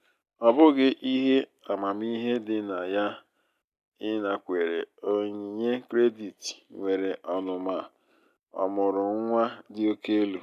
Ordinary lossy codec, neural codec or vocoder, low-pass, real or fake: none; none; 14.4 kHz; real